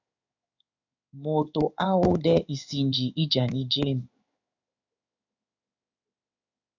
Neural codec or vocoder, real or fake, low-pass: codec, 16 kHz in and 24 kHz out, 1 kbps, XY-Tokenizer; fake; 7.2 kHz